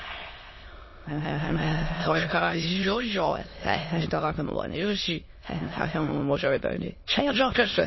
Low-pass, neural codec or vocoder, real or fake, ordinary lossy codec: 7.2 kHz; autoencoder, 22.05 kHz, a latent of 192 numbers a frame, VITS, trained on many speakers; fake; MP3, 24 kbps